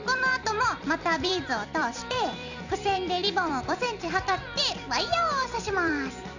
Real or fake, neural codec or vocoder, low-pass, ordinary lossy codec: fake; vocoder, 44.1 kHz, 128 mel bands every 256 samples, BigVGAN v2; 7.2 kHz; none